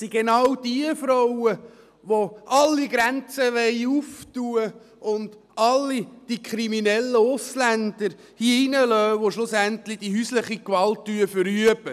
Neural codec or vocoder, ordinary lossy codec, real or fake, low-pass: none; none; real; 14.4 kHz